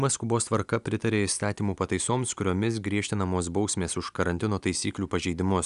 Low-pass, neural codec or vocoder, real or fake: 10.8 kHz; none; real